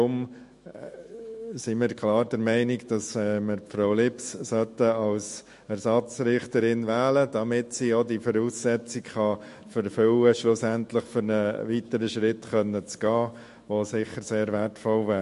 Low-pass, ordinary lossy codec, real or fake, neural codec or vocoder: 14.4 kHz; MP3, 48 kbps; fake; autoencoder, 48 kHz, 128 numbers a frame, DAC-VAE, trained on Japanese speech